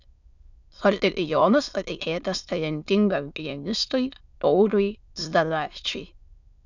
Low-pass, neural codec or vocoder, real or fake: 7.2 kHz; autoencoder, 22.05 kHz, a latent of 192 numbers a frame, VITS, trained on many speakers; fake